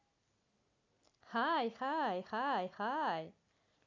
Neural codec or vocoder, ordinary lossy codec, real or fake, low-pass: none; none; real; 7.2 kHz